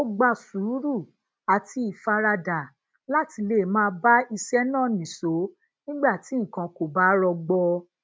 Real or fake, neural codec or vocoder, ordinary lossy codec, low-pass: real; none; none; none